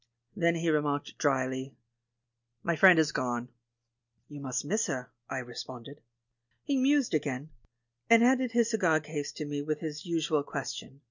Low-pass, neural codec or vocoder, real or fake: 7.2 kHz; none; real